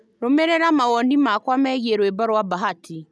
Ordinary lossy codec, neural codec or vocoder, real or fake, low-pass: none; none; real; none